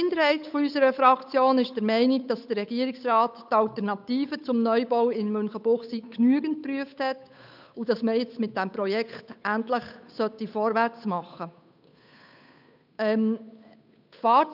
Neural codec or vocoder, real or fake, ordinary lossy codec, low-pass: codec, 16 kHz, 8 kbps, FunCodec, trained on Chinese and English, 25 frames a second; fake; none; 5.4 kHz